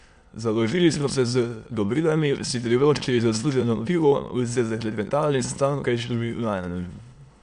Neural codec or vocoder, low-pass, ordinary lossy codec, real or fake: autoencoder, 22.05 kHz, a latent of 192 numbers a frame, VITS, trained on many speakers; 9.9 kHz; MP3, 64 kbps; fake